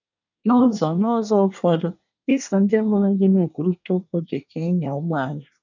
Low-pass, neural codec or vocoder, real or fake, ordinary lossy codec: 7.2 kHz; codec, 24 kHz, 1 kbps, SNAC; fake; none